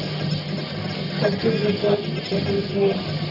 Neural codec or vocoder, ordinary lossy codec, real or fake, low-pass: codec, 44.1 kHz, 1.7 kbps, Pupu-Codec; Opus, 64 kbps; fake; 5.4 kHz